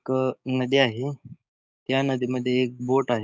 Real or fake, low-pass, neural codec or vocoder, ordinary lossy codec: fake; none; codec, 16 kHz, 6 kbps, DAC; none